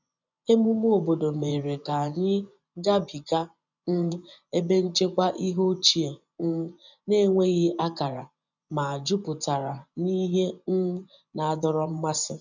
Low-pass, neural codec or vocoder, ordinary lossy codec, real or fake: 7.2 kHz; vocoder, 24 kHz, 100 mel bands, Vocos; none; fake